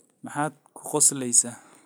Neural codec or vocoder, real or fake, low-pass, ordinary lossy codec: none; real; none; none